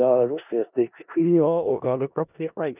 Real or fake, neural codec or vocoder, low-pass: fake; codec, 16 kHz in and 24 kHz out, 0.4 kbps, LongCat-Audio-Codec, four codebook decoder; 3.6 kHz